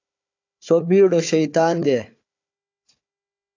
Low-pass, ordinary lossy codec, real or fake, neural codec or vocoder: 7.2 kHz; AAC, 48 kbps; fake; codec, 16 kHz, 4 kbps, FunCodec, trained on Chinese and English, 50 frames a second